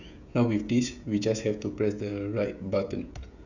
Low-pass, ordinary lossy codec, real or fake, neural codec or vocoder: 7.2 kHz; none; fake; codec, 16 kHz, 16 kbps, FreqCodec, smaller model